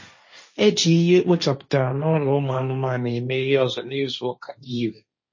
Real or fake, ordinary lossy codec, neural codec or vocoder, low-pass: fake; MP3, 32 kbps; codec, 16 kHz, 1.1 kbps, Voila-Tokenizer; 7.2 kHz